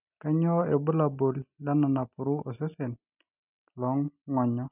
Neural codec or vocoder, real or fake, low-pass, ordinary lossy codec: none; real; 3.6 kHz; none